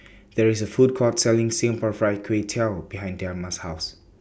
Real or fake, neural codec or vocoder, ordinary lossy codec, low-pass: real; none; none; none